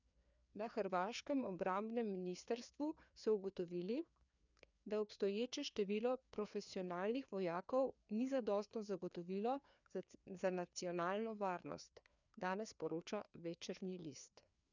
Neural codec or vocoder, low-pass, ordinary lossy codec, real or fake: codec, 16 kHz, 2 kbps, FreqCodec, larger model; 7.2 kHz; none; fake